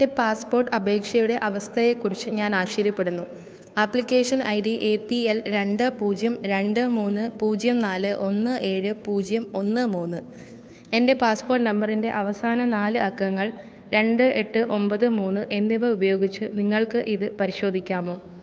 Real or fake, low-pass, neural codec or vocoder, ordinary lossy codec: fake; none; codec, 16 kHz, 2 kbps, FunCodec, trained on Chinese and English, 25 frames a second; none